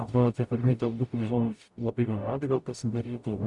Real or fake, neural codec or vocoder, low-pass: fake; codec, 44.1 kHz, 0.9 kbps, DAC; 10.8 kHz